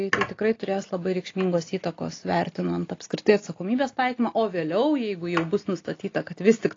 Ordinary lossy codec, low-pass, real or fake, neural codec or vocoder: AAC, 32 kbps; 7.2 kHz; real; none